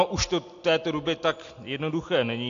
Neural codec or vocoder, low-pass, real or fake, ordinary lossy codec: none; 7.2 kHz; real; AAC, 48 kbps